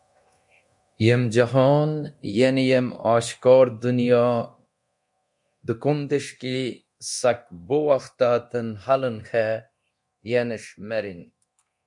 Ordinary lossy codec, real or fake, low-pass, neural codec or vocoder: MP3, 64 kbps; fake; 10.8 kHz; codec, 24 kHz, 0.9 kbps, DualCodec